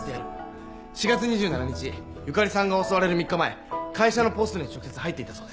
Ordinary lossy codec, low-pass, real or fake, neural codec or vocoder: none; none; real; none